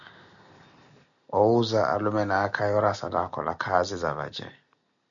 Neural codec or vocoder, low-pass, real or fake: none; 7.2 kHz; real